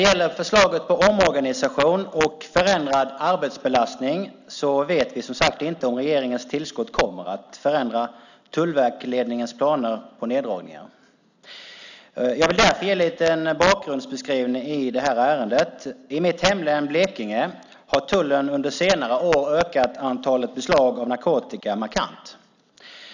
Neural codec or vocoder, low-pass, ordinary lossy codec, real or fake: none; 7.2 kHz; none; real